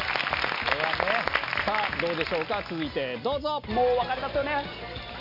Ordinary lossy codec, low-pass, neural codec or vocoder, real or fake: none; 5.4 kHz; none; real